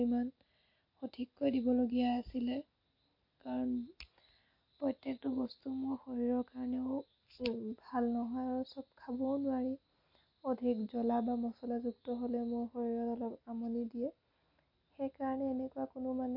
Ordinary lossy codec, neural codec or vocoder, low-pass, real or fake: MP3, 32 kbps; none; 5.4 kHz; real